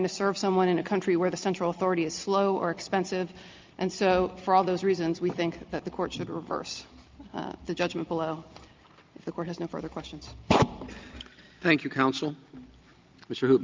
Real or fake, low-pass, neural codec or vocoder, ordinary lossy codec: real; 7.2 kHz; none; Opus, 24 kbps